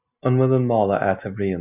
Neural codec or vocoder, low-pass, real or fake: none; 3.6 kHz; real